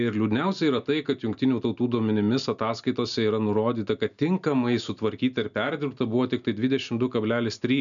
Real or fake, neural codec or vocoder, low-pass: real; none; 7.2 kHz